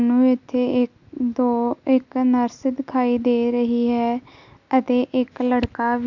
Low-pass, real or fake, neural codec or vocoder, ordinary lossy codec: 7.2 kHz; real; none; none